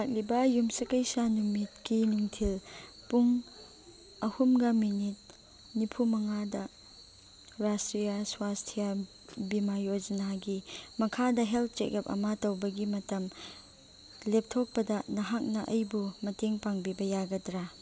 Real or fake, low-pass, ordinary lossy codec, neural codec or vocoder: real; none; none; none